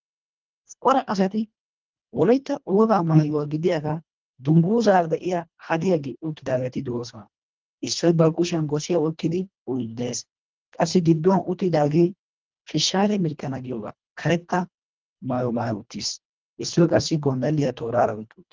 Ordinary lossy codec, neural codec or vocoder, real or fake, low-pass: Opus, 24 kbps; codec, 24 kHz, 1.5 kbps, HILCodec; fake; 7.2 kHz